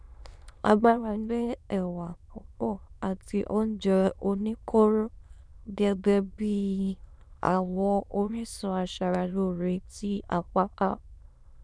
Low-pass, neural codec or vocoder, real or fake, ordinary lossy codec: none; autoencoder, 22.05 kHz, a latent of 192 numbers a frame, VITS, trained on many speakers; fake; none